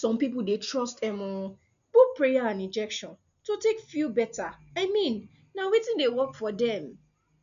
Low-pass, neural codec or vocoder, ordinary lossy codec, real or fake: 7.2 kHz; none; none; real